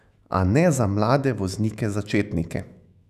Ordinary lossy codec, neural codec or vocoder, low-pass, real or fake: none; autoencoder, 48 kHz, 128 numbers a frame, DAC-VAE, trained on Japanese speech; 14.4 kHz; fake